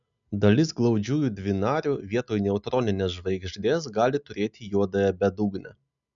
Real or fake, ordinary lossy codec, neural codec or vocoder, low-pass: real; MP3, 96 kbps; none; 7.2 kHz